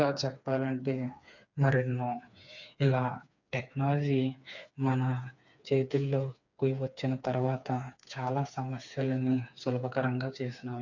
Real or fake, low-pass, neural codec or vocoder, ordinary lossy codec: fake; 7.2 kHz; codec, 16 kHz, 4 kbps, FreqCodec, smaller model; none